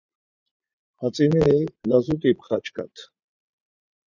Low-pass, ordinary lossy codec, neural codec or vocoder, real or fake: 7.2 kHz; Opus, 64 kbps; vocoder, 44.1 kHz, 80 mel bands, Vocos; fake